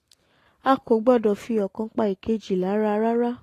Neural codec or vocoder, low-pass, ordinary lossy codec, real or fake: none; 14.4 kHz; AAC, 48 kbps; real